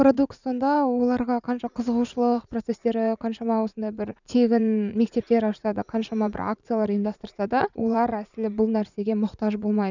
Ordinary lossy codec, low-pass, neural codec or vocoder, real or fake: none; 7.2 kHz; vocoder, 44.1 kHz, 80 mel bands, Vocos; fake